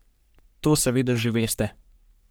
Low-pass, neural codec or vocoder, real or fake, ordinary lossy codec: none; codec, 44.1 kHz, 3.4 kbps, Pupu-Codec; fake; none